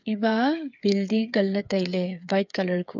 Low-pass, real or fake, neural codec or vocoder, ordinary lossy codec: 7.2 kHz; fake; codec, 16 kHz, 8 kbps, FreqCodec, smaller model; none